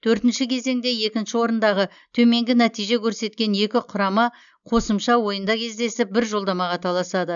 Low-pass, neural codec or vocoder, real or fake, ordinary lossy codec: 7.2 kHz; none; real; none